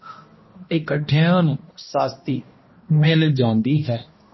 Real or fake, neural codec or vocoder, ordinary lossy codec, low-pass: fake; codec, 16 kHz, 1 kbps, X-Codec, HuBERT features, trained on balanced general audio; MP3, 24 kbps; 7.2 kHz